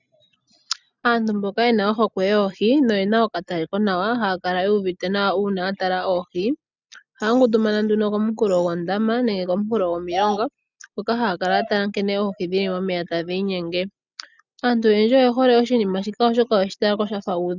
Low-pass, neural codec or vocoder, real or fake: 7.2 kHz; none; real